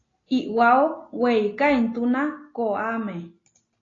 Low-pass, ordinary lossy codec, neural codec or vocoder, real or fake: 7.2 kHz; AAC, 32 kbps; none; real